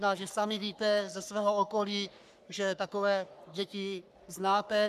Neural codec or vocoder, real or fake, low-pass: codec, 44.1 kHz, 3.4 kbps, Pupu-Codec; fake; 14.4 kHz